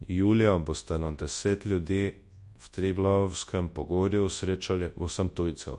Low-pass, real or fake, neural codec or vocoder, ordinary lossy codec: 10.8 kHz; fake; codec, 24 kHz, 0.9 kbps, WavTokenizer, large speech release; MP3, 48 kbps